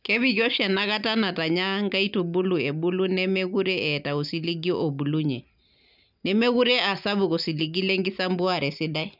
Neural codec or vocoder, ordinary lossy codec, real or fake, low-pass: none; none; real; 5.4 kHz